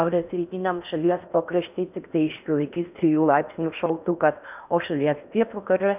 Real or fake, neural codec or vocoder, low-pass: fake; codec, 16 kHz in and 24 kHz out, 0.8 kbps, FocalCodec, streaming, 65536 codes; 3.6 kHz